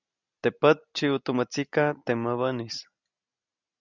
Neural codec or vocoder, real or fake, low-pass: none; real; 7.2 kHz